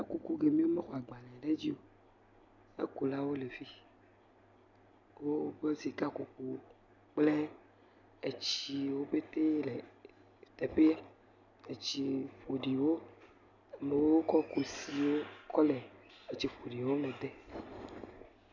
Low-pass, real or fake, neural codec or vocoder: 7.2 kHz; real; none